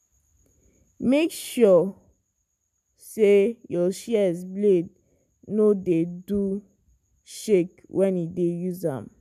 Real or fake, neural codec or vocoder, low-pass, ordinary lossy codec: real; none; 14.4 kHz; none